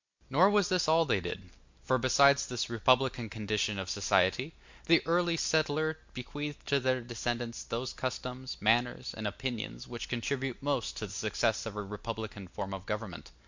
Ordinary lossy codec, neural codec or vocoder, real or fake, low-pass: MP3, 64 kbps; none; real; 7.2 kHz